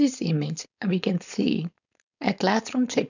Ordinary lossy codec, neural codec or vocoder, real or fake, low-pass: none; codec, 16 kHz, 4.8 kbps, FACodec; fake; 7.2 kHz